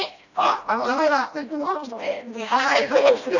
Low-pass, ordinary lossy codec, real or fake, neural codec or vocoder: 7.2 kHz; none; fake; codec, 16 kHz, 1 kbps, FreqCodec, smaller model